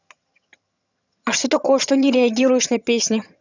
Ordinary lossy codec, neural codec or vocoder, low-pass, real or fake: none; vocoder, 22.05 kHz, 80 mel bands, HiFi-GAN; 7.2 kHz; fake